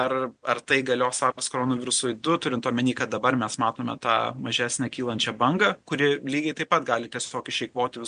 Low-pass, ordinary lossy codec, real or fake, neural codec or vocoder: 9.9 kHz; MP3, 64 kbps; real; none